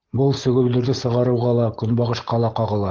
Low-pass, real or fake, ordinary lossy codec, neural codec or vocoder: 7.2 kHz; real; Opus, 16 kbps; none